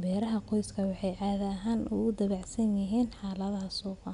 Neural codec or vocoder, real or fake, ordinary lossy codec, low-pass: none; real; none; 10.8 kHz